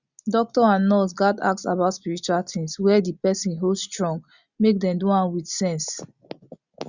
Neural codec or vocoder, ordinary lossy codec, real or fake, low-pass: none; Opus, 64 kbps; real; 7.2 kHz